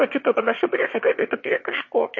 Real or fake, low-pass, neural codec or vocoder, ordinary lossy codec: fake; 7.2 kHz; autoencoder, 22.05 kHz, a latent of 192 numbers a frame, VITS, trained on one speaker; MP3, 32 kbps